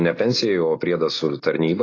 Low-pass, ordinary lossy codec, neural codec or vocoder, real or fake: 7.2 kHz; AAC, 32 kbps; none; real